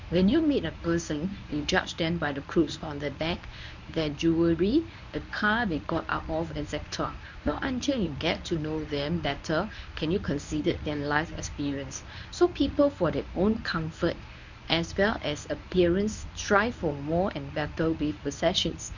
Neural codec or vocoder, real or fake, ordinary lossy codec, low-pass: codec, 24 kHz, 0.9 kbps, WavTokenizer, medium speech release version 1; fake; none; 7.2 kHz